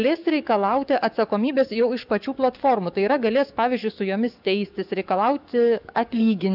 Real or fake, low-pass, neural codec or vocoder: fake; 5.4 kHz; codec, 44.1 kHz, 7.8 kbps, DAC